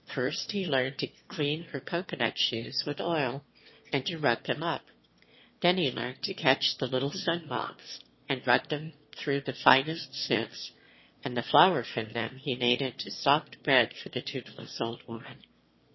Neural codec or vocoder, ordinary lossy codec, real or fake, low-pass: autoencoder, 22.05 kHz, a latent of 192 numbers a frame, VITS, trained on one speaker; MP3, 24 kbps; fake; 7.2 kHz